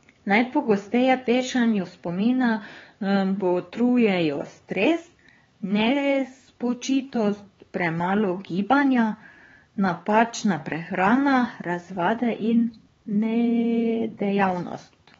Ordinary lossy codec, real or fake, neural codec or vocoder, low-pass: AAC, 24 kbps; fake; codec, 16 kHz, 4 kbps, X-Codec, HuBERT features, trained on LibriSpeech; 7.2 kHz